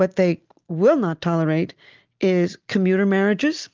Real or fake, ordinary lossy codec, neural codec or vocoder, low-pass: real; Opus, 24 kbps; none; 7.2 kHz